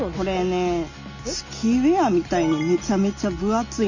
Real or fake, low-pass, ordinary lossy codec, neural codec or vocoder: real; 7.2 kHz; Opus, 64 kbps; none